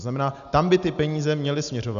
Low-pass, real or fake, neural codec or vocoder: 7.2 kHz; real; none